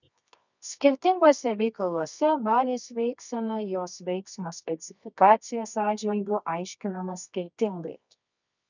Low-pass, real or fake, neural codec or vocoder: 7.2 kHz; fake; codec, 24 kHz, 0.9 kbps, WavTokenizer, medium music audio release